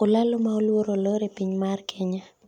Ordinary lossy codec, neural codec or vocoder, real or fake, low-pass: none; none; real; 19.8 kHz